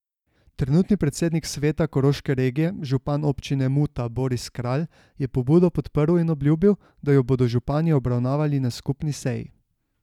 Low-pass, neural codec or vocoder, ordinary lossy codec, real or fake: 19.8 kHz; none; none; real